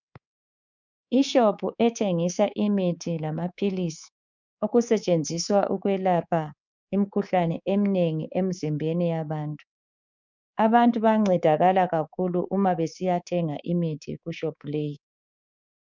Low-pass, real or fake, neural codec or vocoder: 7.2 kHz; fake; codec, 24 kHz, 3.1 kbps, DualCodec